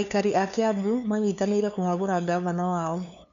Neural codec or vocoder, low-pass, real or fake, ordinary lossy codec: codec, 16 kHz, 2 kbps, FunCodec, trained on LibriTTS, 25 frames a second; 7.2 kHz; fake; none